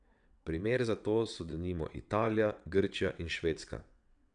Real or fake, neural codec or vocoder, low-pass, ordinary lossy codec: fake; vocoder, 22.05 kHz, 80 mel bands, WaveNeXt; 9.9 kHz; none